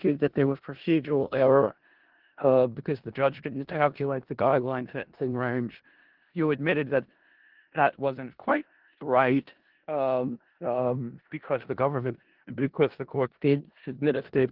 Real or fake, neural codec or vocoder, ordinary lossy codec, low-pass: fake; codec, 16 kHz in and 24 kHz out, 0.4 kbps, LongCat-Audio-Codec, four codebook decoder; Opus, 16 kbps; 5.4 kHz